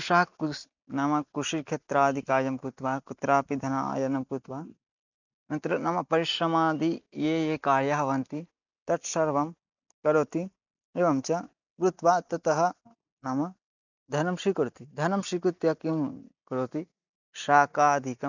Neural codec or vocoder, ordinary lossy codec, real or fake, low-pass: none; none; real; 7.2 kHz